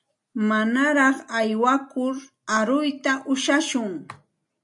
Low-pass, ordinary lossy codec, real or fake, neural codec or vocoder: 10.8 kHz; AAC, 64 kbps; real; none